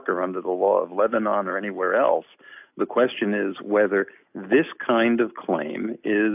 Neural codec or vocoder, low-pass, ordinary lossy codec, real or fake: none; 3.6 kHz; AAC, 32 kbps; real